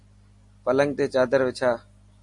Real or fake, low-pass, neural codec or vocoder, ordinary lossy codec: real; 10.8 kHz; none; MP3, 48 kbps